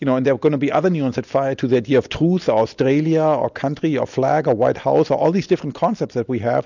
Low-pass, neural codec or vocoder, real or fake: 7.2 kHz; none; real